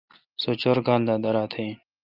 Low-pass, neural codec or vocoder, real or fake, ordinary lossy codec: 5.4 kHz; none; real; Opus, 32 kbps